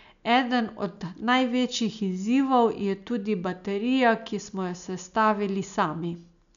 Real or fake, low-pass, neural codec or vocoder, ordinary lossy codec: real; 7.2 kHz; none; none